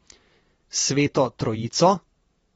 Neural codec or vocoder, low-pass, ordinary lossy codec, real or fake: vocoder, 44.1 kHz, 128 mel bands every 512 samples, BigVGAN v2; 19.8 kHz; AAC, 24 kbps; fake